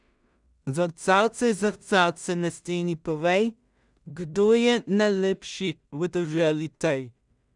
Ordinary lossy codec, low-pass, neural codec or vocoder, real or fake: none; 10.8 kHz; codec, 16 kHz in and 24 kHz out, 0.4 kbps, LongCat-Audio-Codec, two codebook decoder; fake